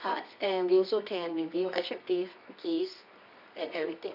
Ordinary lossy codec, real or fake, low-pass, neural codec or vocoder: none; fake; 5.4 kHz; codec, 24 kHz, 0.9 kbps, WavTokenizer, medium music audio release